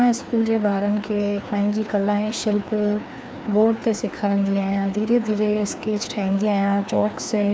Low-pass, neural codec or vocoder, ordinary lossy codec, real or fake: none; codec, 16 kHz, 2 kbps, FreqCodec, larger model; none; fake